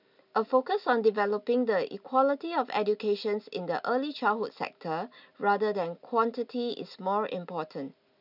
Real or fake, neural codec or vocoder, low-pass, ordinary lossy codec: real; none; 5.4 kHz; none